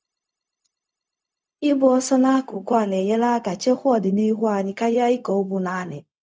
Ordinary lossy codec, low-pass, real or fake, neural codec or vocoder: none; none; fake; codec, 16 kHz, 0.4 kbps, LongCat-Audio-Codec